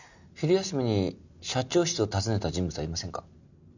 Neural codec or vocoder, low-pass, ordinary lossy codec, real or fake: none; 7.2 kHz; none; real